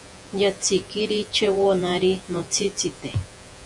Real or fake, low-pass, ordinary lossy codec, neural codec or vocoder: fake; 10.8 kHz; MP3, 64 kbps; vocoder, 48 kHz, 128 mel bands, Vocos